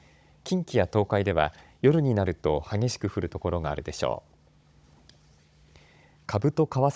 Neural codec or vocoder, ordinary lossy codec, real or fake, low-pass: codec, 16 kHz, 16 kbps, FunCodec, trained on Chinese and English, 50 frames a second; none; fake; none